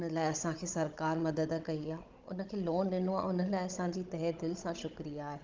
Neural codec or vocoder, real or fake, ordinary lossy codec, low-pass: codec, 16 kHz, 16 kbps, FunCodec, trained on Chinese and English, 50 frames a second; fake; Opus, 32 kbps; 7.2 kHz